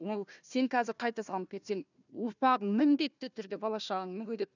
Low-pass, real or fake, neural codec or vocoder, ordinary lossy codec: 7.2 kHz; fake; codec, 16 kHz, 1 kbps, FunCodec, trained on Chinese and English, 50 frames a second; none